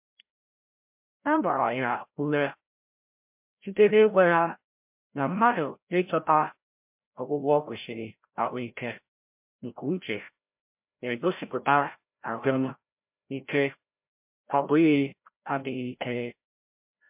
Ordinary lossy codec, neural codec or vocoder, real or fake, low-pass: MP3, 32 kbps; codec, 16 kHz, 0.5 kbps, FreqCodec, larger model; fake; 3.6 kHz